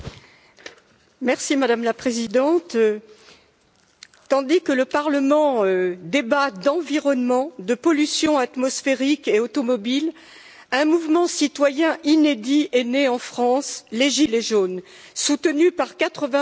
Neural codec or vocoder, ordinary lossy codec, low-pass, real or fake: none; none; none; real